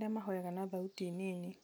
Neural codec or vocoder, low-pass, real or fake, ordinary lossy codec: none; none; real; none